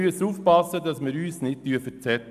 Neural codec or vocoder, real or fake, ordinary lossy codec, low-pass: none; real; none; 14.4 kHz